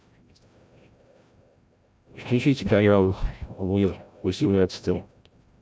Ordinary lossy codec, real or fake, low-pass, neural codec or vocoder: none; fake; none; codec, 16 kHz, 0.5 kbps, FreqCodec, larger model